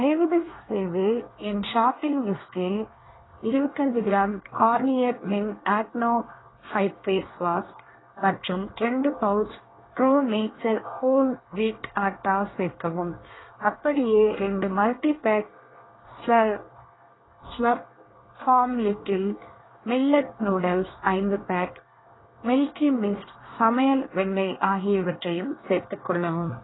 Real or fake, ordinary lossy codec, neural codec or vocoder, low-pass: fake; AAC, 16 kbps; codec, 24 kHz, 1 kbps, SNAC; 7.2 kHz